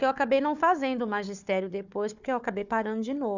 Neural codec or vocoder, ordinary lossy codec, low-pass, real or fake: codec, 16 kHz, 4 kbps, FunCodec, trained on Chinese and English, 50 frames a second; none; 7.2 kHz; fake